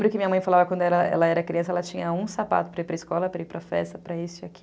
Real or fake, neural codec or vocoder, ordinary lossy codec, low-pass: real; none; none; none